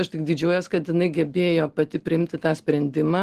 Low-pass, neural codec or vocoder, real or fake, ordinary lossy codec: 14.4 kHz; none; real; Opus, 16 kbps